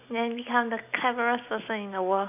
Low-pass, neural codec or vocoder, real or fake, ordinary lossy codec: 3.6 kHz; none; real; none